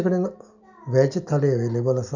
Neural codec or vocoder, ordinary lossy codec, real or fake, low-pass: none; none; real; 7.2 kHz